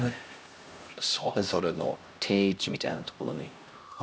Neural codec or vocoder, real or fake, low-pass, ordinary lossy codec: codec, 16 kHz, 1 kbps, X-Codec, HuBERT features, trained on LibriSpeech; fake; none; none